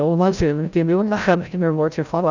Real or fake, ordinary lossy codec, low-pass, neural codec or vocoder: fake; none; 7.2 kHz; codec, 16 kHz, 0.5 kbps, FreqCodec, larger model